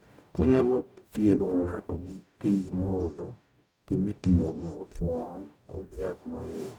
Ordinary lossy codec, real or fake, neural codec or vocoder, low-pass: none; fake; codec, 44.1 kHz, 0.9 kbps, DAC; 19.8 kHz